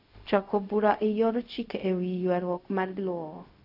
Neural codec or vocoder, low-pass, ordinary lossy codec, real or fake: codec, 16 kHz, 0.4 kbps, LongCat-Audio-Codec; 5.4 kHz; none; fake